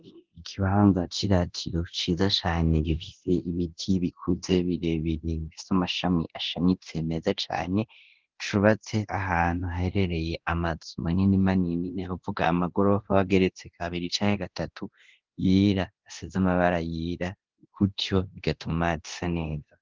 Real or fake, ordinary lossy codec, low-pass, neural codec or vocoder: fake; Opus, 16 kbps; 7.2 kHz; codec, 24 kHz, 0.9 kbps, DualCodec